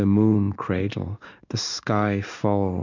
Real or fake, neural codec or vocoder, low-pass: fake; codec, 16 kHz in and 24 kHz out, 1 kbps, XY-Tokenizer; 7.2 kHz